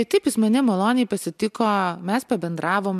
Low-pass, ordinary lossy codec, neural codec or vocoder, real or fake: 14.4 kHz; MP3, 96 kbps; none; real